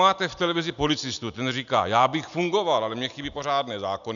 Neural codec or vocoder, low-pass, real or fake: none; 7.2 kHz; real